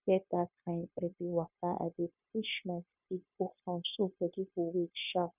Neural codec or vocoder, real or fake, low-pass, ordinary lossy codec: codec, 16 kHz, 0.9 kbps, LongCat-Audio-Codec; fake; 3.6 kHz; none